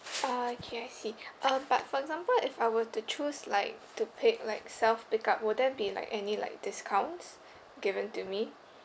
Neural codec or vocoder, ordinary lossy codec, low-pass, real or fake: none; none; none; real